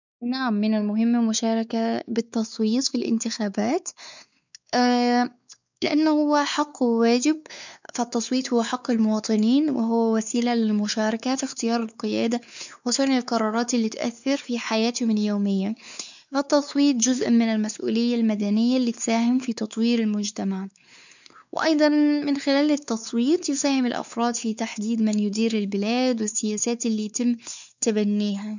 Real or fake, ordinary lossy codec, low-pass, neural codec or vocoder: fake; none; 7.2 kHz; codec, 16 kHz, 4 kbps, X-Codec, WavLM features, trained on Multilingual LibriSpeech